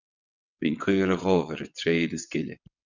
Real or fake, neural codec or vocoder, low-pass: fake; vocoder, 22.05 kHz, 80 mel bands, WaveNeXt; 7.2 kHz